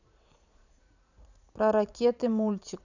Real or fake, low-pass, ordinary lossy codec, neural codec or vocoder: fake; 7.2 kHz; none; vocoder, 44.1 kHz, 80 mel bands, Vocos